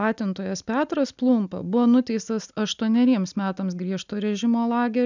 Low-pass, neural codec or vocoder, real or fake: 7.2 kHz; none; real